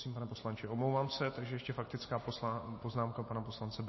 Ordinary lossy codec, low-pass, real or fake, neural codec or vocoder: MP3, 24 kbps; 7.2 kHz; real; none